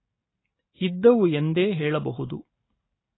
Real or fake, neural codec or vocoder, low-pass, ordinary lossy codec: real; none; 7.2 kHz; AAC, 16 kbps